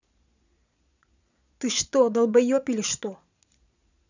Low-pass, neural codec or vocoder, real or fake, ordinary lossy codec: 7.2 kHz; vocoder, 22.05 kHz, 80 mel bands, Vocos; fake; none